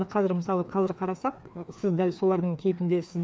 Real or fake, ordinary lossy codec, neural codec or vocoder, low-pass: fake; none; codec, 16 kHz, 2 kbps, FreqCodec, larger model; none